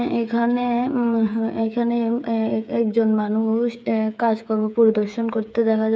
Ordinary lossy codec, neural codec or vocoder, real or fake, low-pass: none; codec, 16 kHz, 8 kbps, FreqCodec, smaller model; fake; none